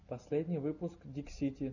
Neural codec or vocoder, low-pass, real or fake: none; 7.2 kHz; real